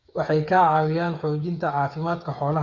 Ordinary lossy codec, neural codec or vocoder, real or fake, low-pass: Opus, 64 kbps; codec, 16 kHz, 8 kbps, FreqCodec, smaller model; fake; 7.2 kHz